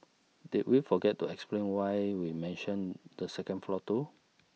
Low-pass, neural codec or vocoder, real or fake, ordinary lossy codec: none; none; real; none